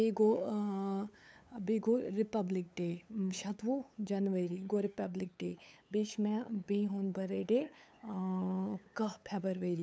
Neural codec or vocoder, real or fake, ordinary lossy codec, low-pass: codec, 16 kHz, 8 kbps, FunCodec, trained on LibriTTS, 25 frames a second; fake; none; none